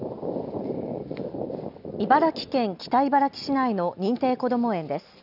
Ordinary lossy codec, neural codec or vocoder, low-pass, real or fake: none; none; 5.4 kHz; real